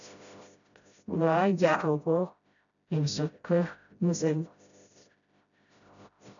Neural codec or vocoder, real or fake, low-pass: codec, 16 kHz, 0.5 kbps, FreqCodec, smaller model; fake; 7.2 kHz